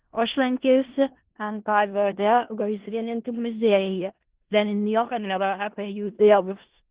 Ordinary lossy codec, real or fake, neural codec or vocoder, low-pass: Opus, 16 kbps; fake; codec, 16 kHz in and 24 kHz out, 0.4 kbps, LongCat-Audio-Codec, four codebook decoder; 3.6 kHz